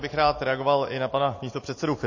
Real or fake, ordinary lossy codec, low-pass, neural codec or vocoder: real; MP3, 32 kbps; 7.2 kHz; none